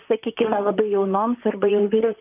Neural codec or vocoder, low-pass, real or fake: vocoder, 44.1 kHz, 128 mel bands, Pupu-Vocoder; 3.6 kHz; fake